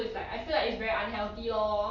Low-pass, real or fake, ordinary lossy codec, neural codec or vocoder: 7.2 kHz; real; none; none